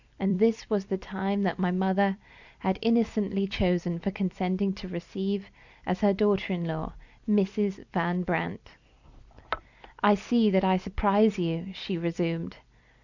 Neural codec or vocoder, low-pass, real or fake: vocoder, 44.1 kHz, 128 mel bands every 256 samples, BigVGAN v2; 7.2 kHz; fake